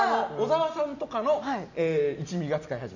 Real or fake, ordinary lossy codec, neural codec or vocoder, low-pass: real; none; none; 7.2 kHz